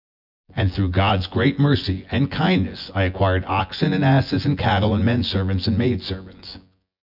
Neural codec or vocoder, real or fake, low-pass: vocoder, 24 kHz, 100 mel bands, Vocos; fake; 5.4 kHz